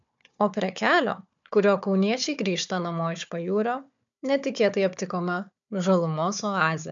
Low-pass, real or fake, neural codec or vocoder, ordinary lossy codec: 7.2 kHz; fake; codec, 16 kHz, 4 kbps, FunCodec, trained on Chinese and English, 50 frames a second; MP3, 64 kbps